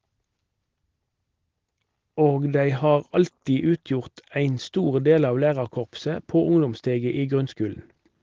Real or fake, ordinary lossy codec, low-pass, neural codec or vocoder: real; Opus, 16 kbps; 7.2 kHz; none